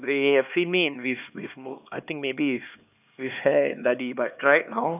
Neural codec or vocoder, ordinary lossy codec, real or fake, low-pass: codec, 16 kHz, 2 kbps, X-Codec, HuBERT features, trained on LibriSpeech; none; fake; 3.6 kHz